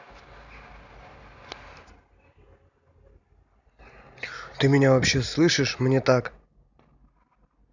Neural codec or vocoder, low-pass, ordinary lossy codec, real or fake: none; 7.2 kHz; none; real